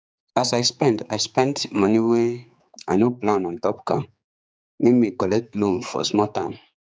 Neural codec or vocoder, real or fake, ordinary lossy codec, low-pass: codec, 16 kHz, 4 kbps, X-Codec, HuBERT features, trained on general audio; fake; none; none